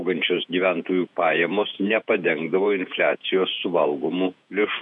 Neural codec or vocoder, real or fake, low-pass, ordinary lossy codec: vocoder, 48 kHz, 128 mel bands, Vocos; fake; 10.8 kHz; MP3, 96 kbps